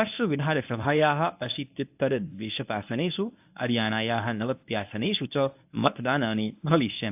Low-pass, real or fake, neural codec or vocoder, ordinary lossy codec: 3.6 kHz; fake; codec, 24 kHz, 0.9 kbps, WavTokenizer, medium speech release version 1; AAC, 32 kbps